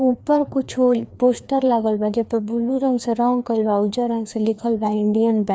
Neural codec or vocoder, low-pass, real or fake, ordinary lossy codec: codec, 16 kHz, 2 kbps, FreqCodec, larger model; none; fake; none